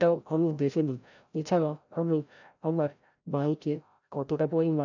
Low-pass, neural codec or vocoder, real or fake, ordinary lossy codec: 7.2 kHz; codec, 16 kHz, 0.5 kbps, FreqCodec, larger model; fake; none